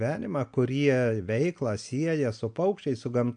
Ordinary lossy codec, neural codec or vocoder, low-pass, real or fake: MP3, 64 kbps; none; 9.9 kHz; real